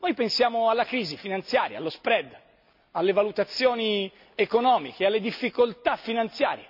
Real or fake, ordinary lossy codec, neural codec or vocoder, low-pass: real; none; none; 5.4 kHz